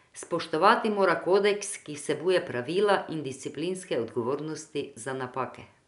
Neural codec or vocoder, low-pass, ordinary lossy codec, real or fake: none; 10.8 kHz; none; real